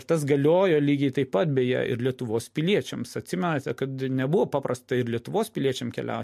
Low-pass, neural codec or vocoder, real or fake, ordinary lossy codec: 14.4 kHz; none; real; MP3, 64 kbps